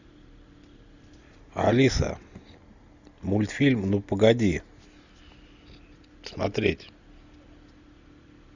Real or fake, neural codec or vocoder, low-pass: real; none; 7.2 kHz